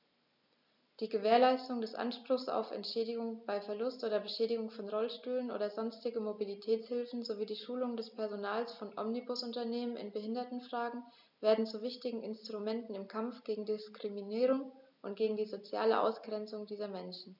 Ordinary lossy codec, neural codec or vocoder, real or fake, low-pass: none; none; real; 5.4 kHz